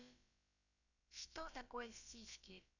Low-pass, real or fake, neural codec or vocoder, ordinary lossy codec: 7.2 kHz; fake; codec, 16 kHz, about 1 kbps, DyCAST, with the encoder's durations; none